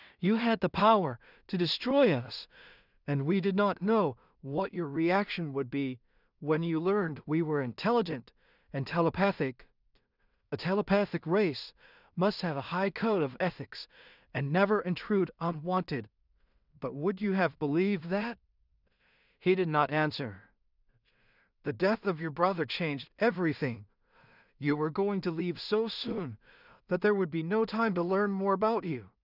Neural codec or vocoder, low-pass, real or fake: codec, 16 kHz in and 24 kHz out, 0.4 kbps, LongCat-Audio-Codec, two codebook decoder; 5.4 kHz; fake